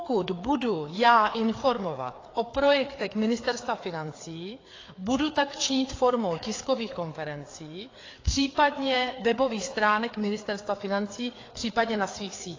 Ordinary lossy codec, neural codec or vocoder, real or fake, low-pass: AAC, 32 kbps; codec, 16 kHz, 4 kbps, FreqCodec, larger model; fake; 7.2 kHz